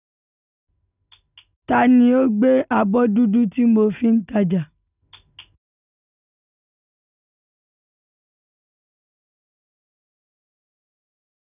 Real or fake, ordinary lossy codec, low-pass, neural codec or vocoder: real; none; 3.6 kHz; none